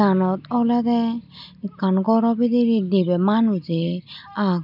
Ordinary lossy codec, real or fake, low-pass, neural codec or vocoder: none; real; 5.4 kHz; none